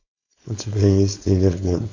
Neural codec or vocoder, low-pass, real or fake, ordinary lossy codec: codec, 16 kHz, 4.8 kbps, FACodec; 7.2 kHz; fake; AAC, 48 kbps